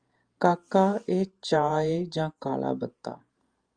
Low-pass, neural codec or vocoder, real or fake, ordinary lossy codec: 9.9 kHz; vocoder, 48 kHz, 128 mel bands, Vocos; fake; Opus, 32 kbps